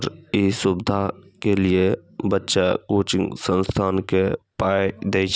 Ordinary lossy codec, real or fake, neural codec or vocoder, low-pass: none; real; none; none